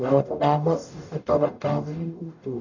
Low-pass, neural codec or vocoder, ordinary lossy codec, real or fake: 7.2 kHz; codec, 44.1 kHz, 0.9 kbps, DAC; none; fake